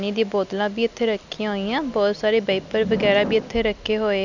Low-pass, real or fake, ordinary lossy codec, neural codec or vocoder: 7.2 kHz; real; none; none